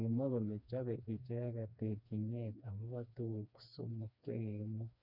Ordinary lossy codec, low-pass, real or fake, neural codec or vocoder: none; 5.4 kHz; fake; codec, 16 kHz, 2 kbps, FreqCodec, smaller model